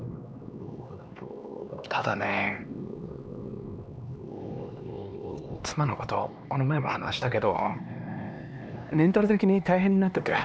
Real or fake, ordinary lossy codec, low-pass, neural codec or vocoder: fake; none; none; codec, 16 kHz, 2 kbps, X-Codec, HuBERT features, trained on LibriSpeech